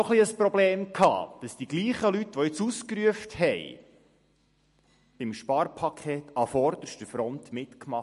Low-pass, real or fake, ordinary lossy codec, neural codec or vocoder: 14.4 kHz; real; MP3, 48 kbps; none